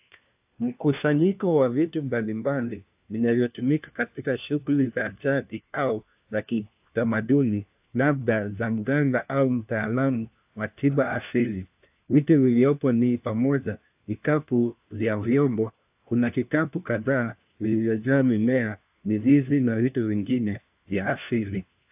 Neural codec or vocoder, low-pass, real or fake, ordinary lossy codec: codec, 16 kHz, 1 kbps, FunCodec, trained on LibriTTS, 50 frames a second; 3.6 kHz; fake; AAC, 32 kbps